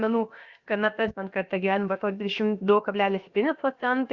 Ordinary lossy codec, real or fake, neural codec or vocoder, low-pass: Opus, 64 kbps; fake; codec, 16 kHz, about 1 kbps, DyCAST, with the encoder's durations; 7.2 kHz